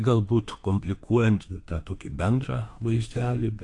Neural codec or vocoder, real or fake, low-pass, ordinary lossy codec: autoencoder, 48 kHz, 32 numbers a frame, DAC-VAE, trained on Japanese speech; fake; 10.8 kHz; AAC, 48 kbps